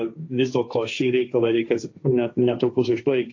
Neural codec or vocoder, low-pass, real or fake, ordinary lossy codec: codec, 16 kHz, 1.1 kbps, Voila-Tokenizer; 7.2 kHz; fake; AAC, 48 kbps